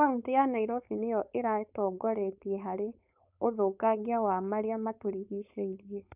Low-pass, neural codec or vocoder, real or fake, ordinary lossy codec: 3.6 kHz; codec, 16 kHz, 4.8 kbps, FACodec; fake; none